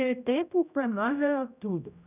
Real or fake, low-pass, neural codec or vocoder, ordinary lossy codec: fake; 3.6 kHz; codec, 16 kHz, 0.5 kbps, X-Codec, HuBERT features, trained on general audio; none